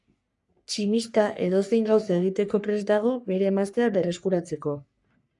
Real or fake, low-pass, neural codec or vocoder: fake; 10.8 kHz; codec, 44.1 kHz, 3.4 kbps, Pupu-Codec